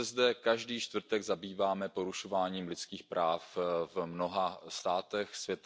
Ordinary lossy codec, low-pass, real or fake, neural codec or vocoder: none; none; real; none